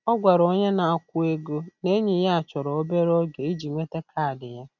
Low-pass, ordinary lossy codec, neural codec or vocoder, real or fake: 7.2 kHz; none; none; real